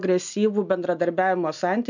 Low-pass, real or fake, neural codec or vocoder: 7.2 kHz; real; none